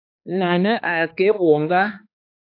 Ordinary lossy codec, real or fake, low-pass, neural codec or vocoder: AAC, 32 kbps; fake; 5.4 kHz; codec, 16 kHz, 2 kbps, X-Codec, HuBERT features, trained on balanced general audio